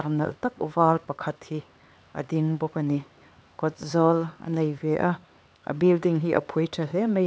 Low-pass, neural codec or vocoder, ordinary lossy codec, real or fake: none; codec, 16 kHz, 4 kbps, X-Codec, HuBERT features, trained on LibriSpeech; none; fake